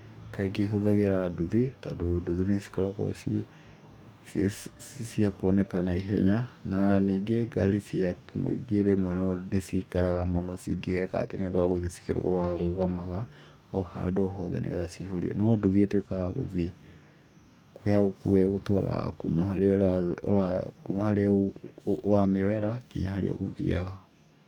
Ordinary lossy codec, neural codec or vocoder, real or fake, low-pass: none; codec, 44.1 kHz, 2.6 kbps, DAC; fake; 19.8 kHz